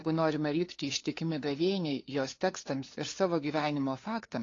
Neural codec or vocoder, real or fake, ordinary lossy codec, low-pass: codec, 16 kHz, 2 kbps, FunCodec, trained on LibriTTS, 25 frames a second; fake; AAC, 32 kbps; 7.2 kHz